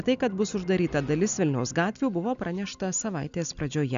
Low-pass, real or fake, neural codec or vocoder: 7.2 kHz; real; none